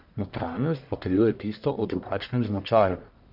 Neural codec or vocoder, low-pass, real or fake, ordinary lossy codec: codec, 44.1 kHz, 1.7 kbps, Pupu-Codec; 5.4 kHz; fake; none